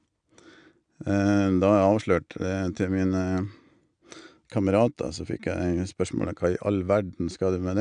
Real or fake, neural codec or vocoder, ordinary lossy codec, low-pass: real; none; none; 9.9 kHz